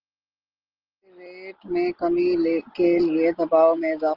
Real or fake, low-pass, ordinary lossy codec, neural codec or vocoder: real; 5.4 kHz; Opus, 16 kbps; none